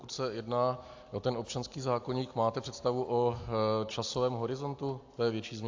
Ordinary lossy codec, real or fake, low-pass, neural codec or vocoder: AAC, 48 kbps; real; 7.2 kHz; none